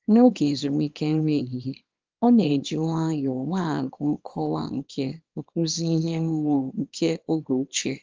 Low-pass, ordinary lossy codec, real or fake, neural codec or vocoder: 7.2 kHz; Opus, 32 kbps; fake; codec, 24 kHz, 0.9 kbps, WavTokenizer, small release